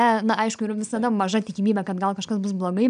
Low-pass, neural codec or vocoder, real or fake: 9.9 kHz; none; real